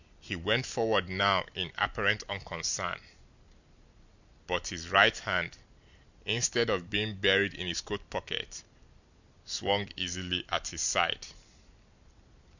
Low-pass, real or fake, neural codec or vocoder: 7.2 kHz; real; none